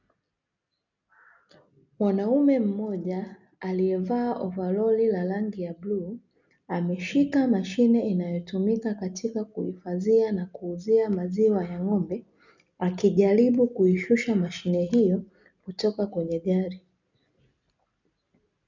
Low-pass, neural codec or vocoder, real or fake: 7.2 kHz; none; real